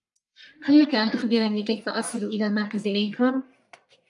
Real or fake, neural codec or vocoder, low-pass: fake; codec, 44.1 kHz, 1.7 kbps, Pupu-Codec; 10.8 kHz